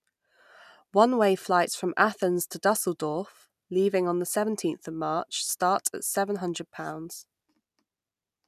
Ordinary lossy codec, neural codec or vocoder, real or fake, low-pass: none; none; real; 14.4 kHz